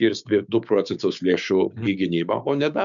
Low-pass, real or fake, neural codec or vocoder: 7.2 kHz; fake; codec, 16 kHz, 6 kbps, DAC